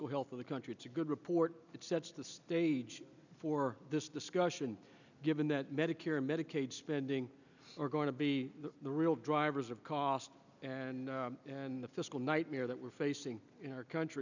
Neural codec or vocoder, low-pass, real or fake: none; 7.2 kHz; real